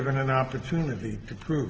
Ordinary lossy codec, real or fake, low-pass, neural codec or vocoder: Opus, 16 kbps; real; 7.2 kHz; none